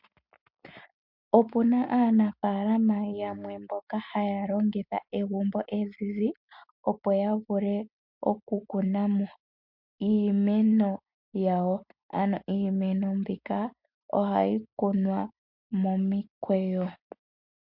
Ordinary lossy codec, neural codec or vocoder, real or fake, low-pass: MP3, 48 kbps; none; real; 5.4 kHz